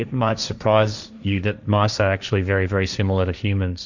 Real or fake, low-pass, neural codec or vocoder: fake; 7.2 kHz; codec, 16 kHz, 1.1 kbps, Voila-Tokenizer